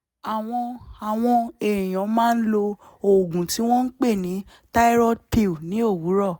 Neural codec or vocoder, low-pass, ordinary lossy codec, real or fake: none; none; none; real